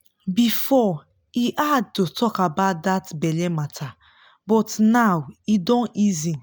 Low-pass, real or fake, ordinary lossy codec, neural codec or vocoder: none; real; none; none